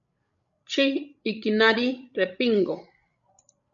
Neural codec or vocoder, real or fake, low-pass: codec, 16 kHz, 16 kbps, FreqCodec, larger model; fake; 7.2 kHz